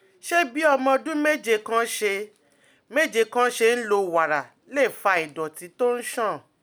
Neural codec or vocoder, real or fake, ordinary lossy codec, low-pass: none; real; none; none